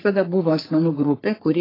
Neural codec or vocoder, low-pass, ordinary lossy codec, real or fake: codec, 44.1 kHz, 3.4 kbps, Pupu-Codec; 5.4 kHz; AAC, 24 kbps; fake